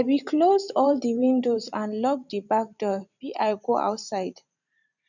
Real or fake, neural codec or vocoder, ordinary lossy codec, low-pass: fake; vocoder, 44.1 kHz, 128 mel bands every 512 samples, BigVGAN v2; none; 7.2 kHz